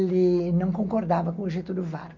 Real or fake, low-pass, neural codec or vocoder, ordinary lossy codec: real; 7.2 kHz; none; none